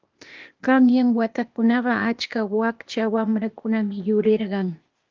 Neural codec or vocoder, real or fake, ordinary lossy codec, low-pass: codec, 16 kHz, 0.8 kbps, ZipCodec; fake; Opus, 24 kbps; 7.2 kHz